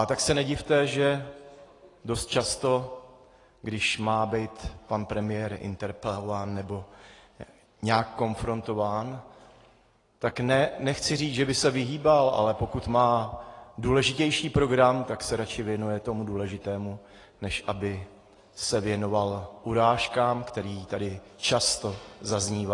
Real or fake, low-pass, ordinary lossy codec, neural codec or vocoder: real; 10.8 kHz; AAC, 32 kbps; none